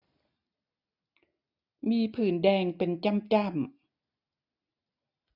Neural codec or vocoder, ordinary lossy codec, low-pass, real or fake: none; none; 5.4 kHz; real